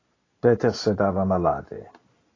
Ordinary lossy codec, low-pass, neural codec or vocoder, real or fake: AAC, 32 kbps; 7.2 kHz; none; real